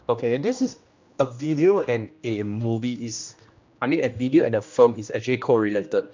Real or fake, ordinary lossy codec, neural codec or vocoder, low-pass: fake; MP3, 64 kbps; codec, 16 kHz, 1 kbps, X-Codec, HuBERT features, trained on general audio; 7.2 kHz